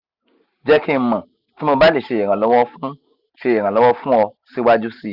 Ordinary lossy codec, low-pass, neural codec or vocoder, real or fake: Opus, 64 kbps; 5.4 kHz; none; real